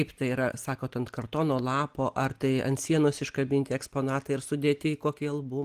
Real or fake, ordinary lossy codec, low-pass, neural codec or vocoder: real; Opus, 24 kbps; 14.4 kHz; none